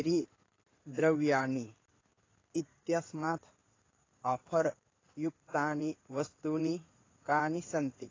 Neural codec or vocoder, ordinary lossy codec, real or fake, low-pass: codec, 16 kHz in and 24 kHz out, 2.2 kbps, FireRedTTS-2 codec; AAC, 32 kbps; fake; 7.2 kHz